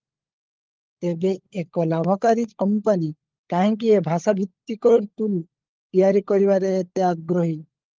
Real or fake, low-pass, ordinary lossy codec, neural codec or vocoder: fake; 7.2 kHz; Opus, 32 kbps; codec, 16 kHz, 16 kbps, FunCodec, trained on LibriTTS, 50 frames a second